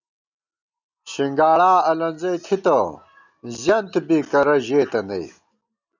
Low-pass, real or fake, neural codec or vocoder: 7.2 kHz; real; none